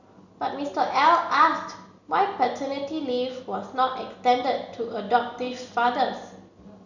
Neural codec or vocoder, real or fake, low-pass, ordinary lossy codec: none; real; 7.2 kHz; none